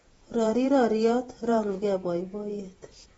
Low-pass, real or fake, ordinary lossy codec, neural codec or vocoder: 19.8 kHz; fake; AAC, 24 kbps; vocoder, 48 kHz, 128 mel bands, Vocos